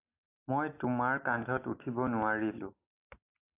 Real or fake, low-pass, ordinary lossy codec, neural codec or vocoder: real; 3.6 kHz; AAC, 24 kbps; none